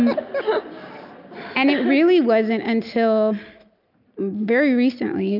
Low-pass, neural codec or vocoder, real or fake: 5.4 kHz; none; real